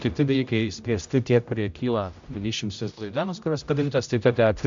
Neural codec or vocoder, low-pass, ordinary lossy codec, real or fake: codec, 16 kHz, 0.5 kbps, X-Codec, HuBERT features, trained on general audio; 7.2 kHz; MP3, 64 kbps; fake